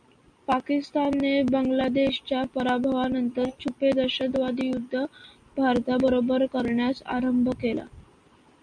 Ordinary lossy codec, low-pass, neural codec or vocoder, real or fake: MP3, 96 kbps; 9.9 kHz; none; real